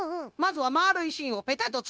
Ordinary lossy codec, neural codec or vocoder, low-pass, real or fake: none; codec, 16 kHz, 0.9 kbps, LongCat-Audio-Codec; none; fake